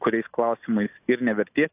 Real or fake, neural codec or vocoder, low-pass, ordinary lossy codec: real; none; 3.6 kHz; AAC, 32 kbps